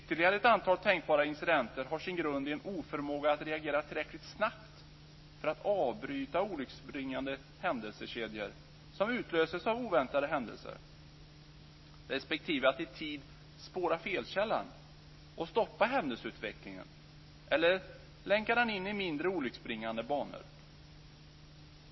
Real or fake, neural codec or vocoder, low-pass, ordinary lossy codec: real; none; 7.2 kHz; MP3, 24 kbps